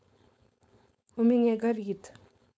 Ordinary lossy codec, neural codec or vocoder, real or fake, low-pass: none; codec, 16 kHz, 4.8 kbps, FACodec; fake; none